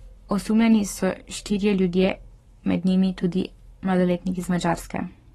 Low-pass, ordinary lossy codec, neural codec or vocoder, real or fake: 19.8 kHz; AAC, 32 kbps; codec, 44.1 kHz, 7.8 kbps, Pupu-Codec; fake